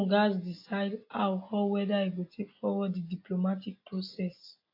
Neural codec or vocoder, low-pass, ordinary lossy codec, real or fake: none; 5.4 kHz; AAC, 32 kbps; real